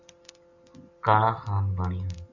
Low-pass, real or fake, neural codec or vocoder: 7.2 kHz; real; none